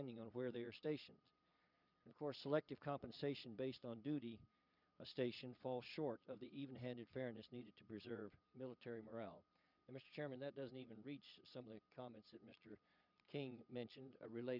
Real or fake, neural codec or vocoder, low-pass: fake; vocoder, 22.05 kHz, 80 mel bands, Vocos; 5.4 kHz